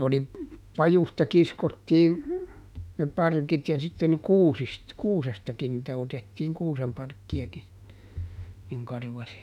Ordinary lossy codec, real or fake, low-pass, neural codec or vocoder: none; fake; 19.8 kHz; autoencoder, 48 kHz, 32 numbers a frame, DAC-VAE, trained on Japanese speech